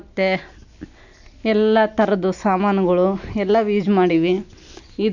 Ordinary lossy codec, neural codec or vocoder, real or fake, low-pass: none; none; real; 7.2 kHz